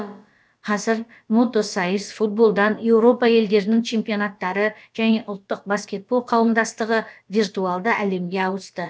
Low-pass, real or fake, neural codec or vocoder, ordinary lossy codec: none; fake; codec, 16 kHz, about 1 kbps, DyCAST, with the encoder's durations; none